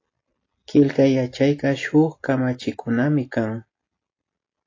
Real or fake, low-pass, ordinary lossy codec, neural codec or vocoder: real; 7.2 kHz; AAC, 32 kbps; none